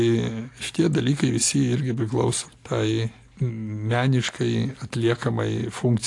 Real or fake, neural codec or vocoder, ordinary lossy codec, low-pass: real; none; AAC, 48 kbps; 10.8 kHz